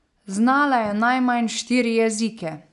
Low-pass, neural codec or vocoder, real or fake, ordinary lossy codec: 10.8 kHz; none; real; none